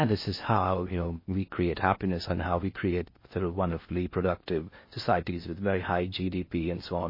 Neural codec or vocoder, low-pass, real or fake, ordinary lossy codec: codec, 16 kHz in and 24 kHz out, 0.8 kbps, FocalCodec, streaming, 65536 codes; 5.4 kHz; fake; MP3, 24 kbps